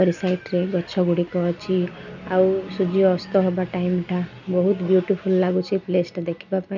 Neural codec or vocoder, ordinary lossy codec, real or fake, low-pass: none; none; real; 7.2 kHz